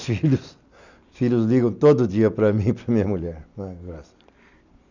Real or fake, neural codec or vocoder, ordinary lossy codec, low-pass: real; none; none; 7.2 kHz